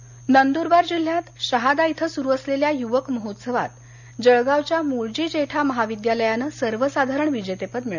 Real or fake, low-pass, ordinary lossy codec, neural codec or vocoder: real; 7.2 kHz; none; none